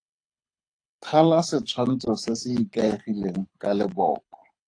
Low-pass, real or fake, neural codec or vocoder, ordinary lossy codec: 9.9 kHz; fake; codec, 24 kHz, 6 kbps, HILCodec; AAC, 48 kbps